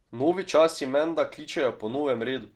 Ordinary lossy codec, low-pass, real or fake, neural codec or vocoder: Opus, 16 kbps; 19.8 kHz; real; none